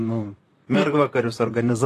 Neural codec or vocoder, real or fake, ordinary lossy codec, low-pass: vocoder, 44.1 kHz, 128 mel bands, Pupu-Vocoder; fake; AAC, 48 kbps; 14.4 kHz